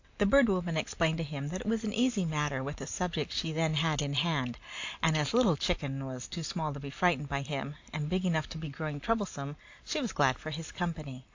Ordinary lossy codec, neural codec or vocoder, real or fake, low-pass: AAC, 48 kbps; none; real; 7.2 kHz